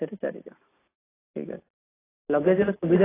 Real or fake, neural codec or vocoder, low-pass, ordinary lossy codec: real; none; 3.6 kHz; AAC, 16 kbps